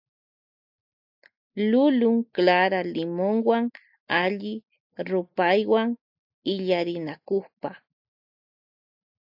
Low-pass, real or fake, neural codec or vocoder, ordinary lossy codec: 5.4 kHz; real; none; AAC, 48 kbps